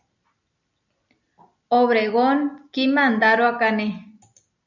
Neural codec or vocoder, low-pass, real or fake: none; 7.2 kHz; real